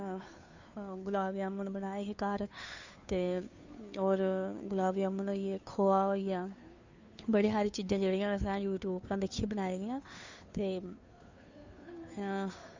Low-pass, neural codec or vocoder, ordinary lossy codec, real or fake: 7.2 kHz; codec, 16 kHz, 2 kbps, FunCodec, trained on Chinese and English, 25 frames a second; Opus, 64 kbps; fake